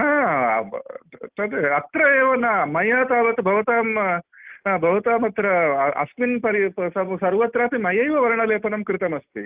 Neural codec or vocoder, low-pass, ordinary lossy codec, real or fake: none; 3.6 kHz; Opus, 32 kbps; real